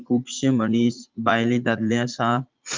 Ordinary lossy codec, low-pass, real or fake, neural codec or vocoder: Opus, 24 kbps; 7.2 kHz; fake; vocoder, 44.1 kHz, 80 mel bands, Vocos